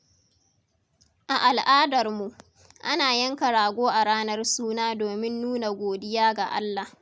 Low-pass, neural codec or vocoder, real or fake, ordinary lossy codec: none; none; real; none